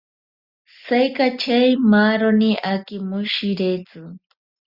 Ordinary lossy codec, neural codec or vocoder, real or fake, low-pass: Opus, 64 kbps; none; real; 5.4 kHz